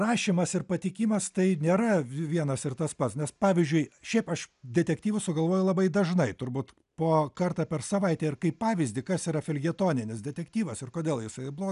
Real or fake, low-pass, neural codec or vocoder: real; 10.8 kHz; none